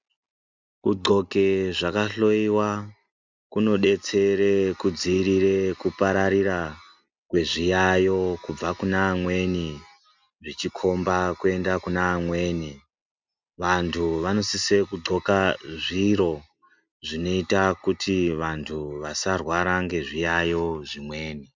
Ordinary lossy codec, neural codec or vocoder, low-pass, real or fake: MP3, 64 kbps; none; 7.2 kHz; real